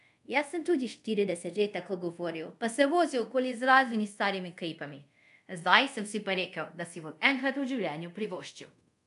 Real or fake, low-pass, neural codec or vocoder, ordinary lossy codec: fake; 10.8 kHz; codec, 24 kHz, 0.5 kbps, DualCodec; none